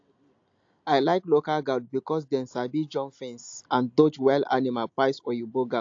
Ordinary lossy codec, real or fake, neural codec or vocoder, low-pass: AAC, 48 kbps; real; none; 7.2 kHz